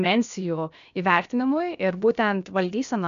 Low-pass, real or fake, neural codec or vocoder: 7.2 kHz; fake; codec, 16 kHz, about 1 kbps, DyCAST, with the encoder's durations